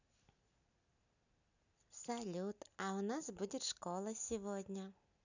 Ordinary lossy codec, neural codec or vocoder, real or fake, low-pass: none; none; real; 7.2 kHz